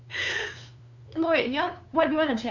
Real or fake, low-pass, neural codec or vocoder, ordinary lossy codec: fake; 7.2 kHz; codec, 16 kHz, 2 kbps, FunCodec, trained on LibriTTS, 25 frames a second; none